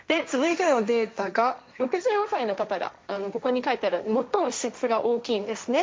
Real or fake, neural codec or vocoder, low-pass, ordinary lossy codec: fake; codec, 16 kHz, 1.1 kbps, Voila-Tokenizer; none; none